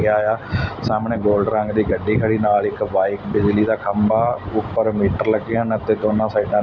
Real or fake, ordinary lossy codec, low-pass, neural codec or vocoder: real; none; none; none